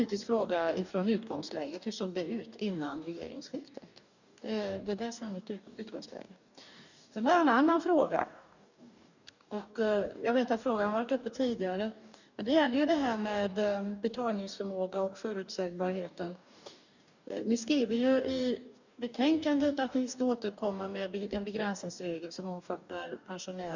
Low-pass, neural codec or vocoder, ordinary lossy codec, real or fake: 7.2 kHz; codec, 44.1 kHz, 2.6 kbps, DAC; none; fake